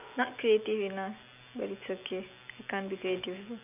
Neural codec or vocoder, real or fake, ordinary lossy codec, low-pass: none; real; none; 3.6 kHz